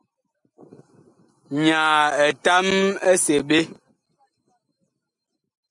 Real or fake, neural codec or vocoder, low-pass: real; none; 10.8 kHz